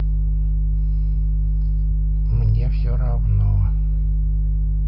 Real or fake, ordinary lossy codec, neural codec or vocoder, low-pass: real; none; none; 5.4 kHz